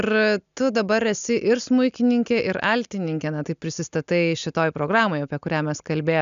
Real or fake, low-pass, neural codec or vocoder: real; 7.2 kHz; none